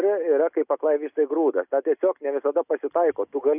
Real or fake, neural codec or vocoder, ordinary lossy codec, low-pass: real; none; AAC, 32 kbps; 3.6 kHz